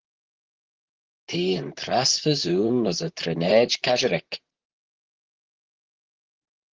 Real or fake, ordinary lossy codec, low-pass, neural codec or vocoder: fake; Opus, 16 kbps; 7.2 kHz; vocoder, 44.1 kHz, 128 mel bands, Pupu-Vocoder